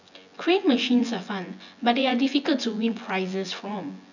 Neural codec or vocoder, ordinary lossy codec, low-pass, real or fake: vocoder, 24 kHz, 100 mel bands, Vocos; none; 7.2 kHz; fake